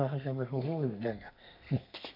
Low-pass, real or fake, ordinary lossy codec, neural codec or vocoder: 5.4 kHz; fake; MP3, 48 kbps; codec, 32 kHz, 1.9 kbps, SNAC